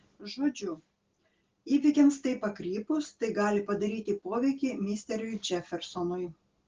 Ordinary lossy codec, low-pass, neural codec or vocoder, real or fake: Opus, 16 kbps; 7.2 kHz; none; real